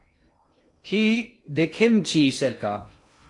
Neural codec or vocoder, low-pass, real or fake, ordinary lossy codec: codec, 16 kHz in and 24 kHz out, 0.6 kbps, FocalCodec, streaming, 2048 codes; 10.8 kHz; fake; AAC, 48 kbps